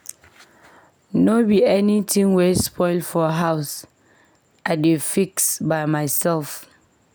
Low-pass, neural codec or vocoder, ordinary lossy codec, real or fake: none; none; none; real